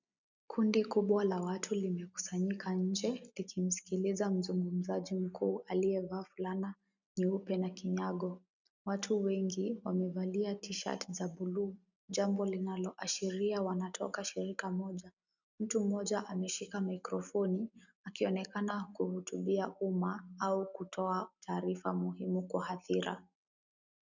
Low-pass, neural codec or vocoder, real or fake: 7.2 kHz; none; real